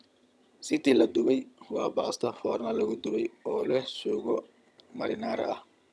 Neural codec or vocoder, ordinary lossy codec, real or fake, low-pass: vocoder, 22.05 kHz, 80 mel bands, HiFi-GAN; none; fake; none